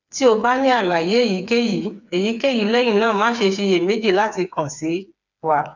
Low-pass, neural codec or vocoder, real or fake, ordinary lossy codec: 7.2 kHz; codec, 16 kHz, 4 kbps, FreqCodec, smaller model; fake; none